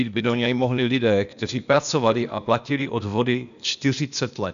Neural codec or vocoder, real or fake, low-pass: codec, 16 kHz, 0.8 kbps, ZipCodec; fake; 7.2 kHz